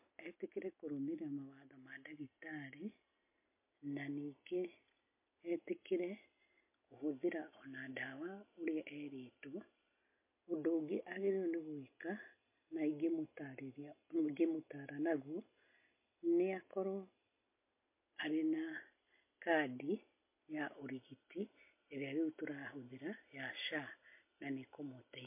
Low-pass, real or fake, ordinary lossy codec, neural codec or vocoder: 3.6 kHz; real; MP3, 24 kbps; none